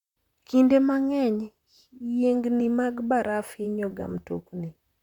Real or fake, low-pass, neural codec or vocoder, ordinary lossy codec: fake; 19.8 kHz; vocoder, 44.1 kHz, 128 mel bands, Pupu-Vocoder; none